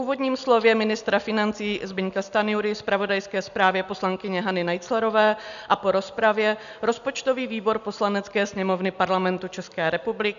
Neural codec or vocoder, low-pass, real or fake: none; 7.2 kHz; real